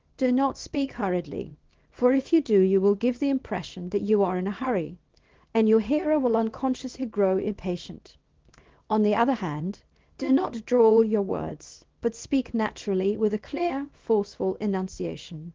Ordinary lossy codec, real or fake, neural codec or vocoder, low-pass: Opus, 16 kbps; fake; codec, 24 kHz, 0.9 kbps, WavTokenizer, small release; 7.2 kHz